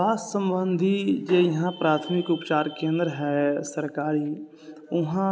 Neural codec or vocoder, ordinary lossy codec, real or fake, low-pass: none; none; real; none